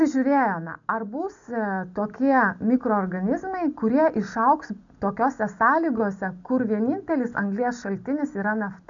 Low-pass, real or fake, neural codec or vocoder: 7.2 kHz; real; none